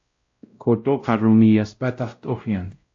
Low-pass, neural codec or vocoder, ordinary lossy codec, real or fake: 7.2 kHz; codec, 16 kHz, 0.5 kbps, X-Codec, WavLM features, trained on Multilingual LibriSpeech; AAC, 48 kbps; fake